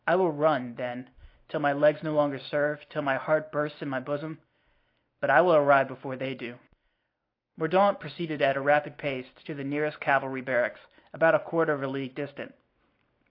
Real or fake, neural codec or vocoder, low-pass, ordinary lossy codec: real; none; 5.4 kHz; AAC, 32 kbps